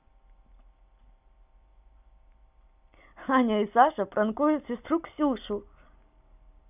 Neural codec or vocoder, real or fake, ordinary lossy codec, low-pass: none; real; none; 3.6 kHz